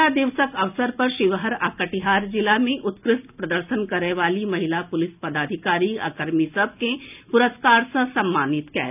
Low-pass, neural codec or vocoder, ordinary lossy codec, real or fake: 3.6 kHz; none; none; real